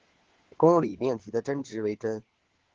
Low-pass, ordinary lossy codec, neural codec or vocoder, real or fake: 7.2 kHz; Opus, 16 kbps; codec, 16 kHz, 4 kbps, FunCodec, trained on LibriTTS, 50 frames a second; fake